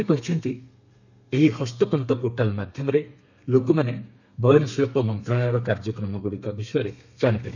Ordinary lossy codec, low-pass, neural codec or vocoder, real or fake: none; 7.2 kHz; codec, 32 kHz, 1.9 kbps, SNAC; fake